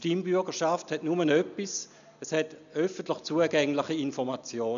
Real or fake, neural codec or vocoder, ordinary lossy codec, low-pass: real; none; none; 7.2 kHz